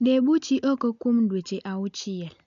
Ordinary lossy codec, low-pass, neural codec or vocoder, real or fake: none; 7.2 kHz; none; real